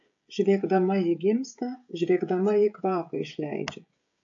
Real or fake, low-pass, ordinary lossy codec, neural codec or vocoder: fake; 7.2 kHz; AAC, 48 kbps; codec, 16 kHz, 16 kbps, FreqCodec, smaller model